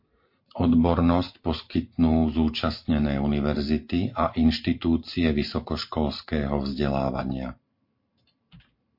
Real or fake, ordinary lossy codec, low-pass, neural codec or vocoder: fake; MP3, 32 kbps; 5.4 kHz; vocoder, 44.1 kHz, 128 mel bands every 512 samples, BigVGAN v2